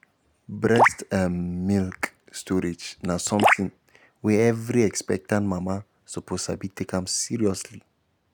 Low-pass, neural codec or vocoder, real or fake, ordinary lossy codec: none; none; real; none